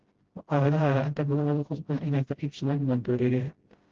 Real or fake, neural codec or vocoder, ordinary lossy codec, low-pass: fake; codec, 16 kHz, 0.5 kbps, FreqCodec, smaller model; Opus, 16 kbps; 7.2 kHz